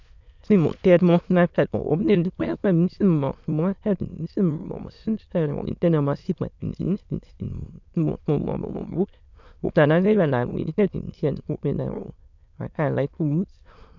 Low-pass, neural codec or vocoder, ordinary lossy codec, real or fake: 7.2 kHz; autoencoder, 22.05 kHz, a latent of 192 numbers a frame, VITS, trained on many speakers; none; fake